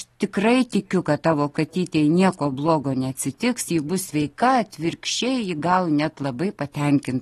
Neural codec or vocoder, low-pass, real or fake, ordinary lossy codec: none; 19.8 kHz; real; AAC, 32 kbps